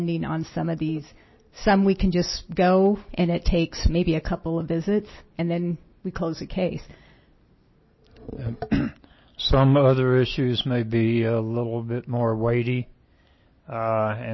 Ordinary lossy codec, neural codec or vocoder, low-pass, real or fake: MP3, 24 kbps; none; 7.2 kHz; real